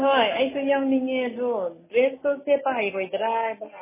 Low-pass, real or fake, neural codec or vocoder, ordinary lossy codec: 3.6 kHz; real; none; MP3, 16 kbps